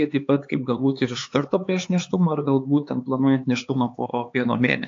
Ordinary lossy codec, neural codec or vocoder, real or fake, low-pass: AAC, 48 kbps; codec, 16 kHz, 4 kbps, X-Codec, HuBERT features, trained on LibriSpeech; fake; 7.2 kHz